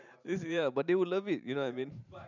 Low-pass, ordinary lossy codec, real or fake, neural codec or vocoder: 7.2 kHz; none; fake; vocoder, 44.1 kHz, 128 mel bands every 512 samples, BigVGAN v2